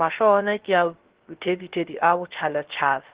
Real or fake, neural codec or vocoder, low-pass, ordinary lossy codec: fake; codec, 16 kHz, 0.3 kbps, FocalCodec; 3.6 kHz; Opus, 16 kbps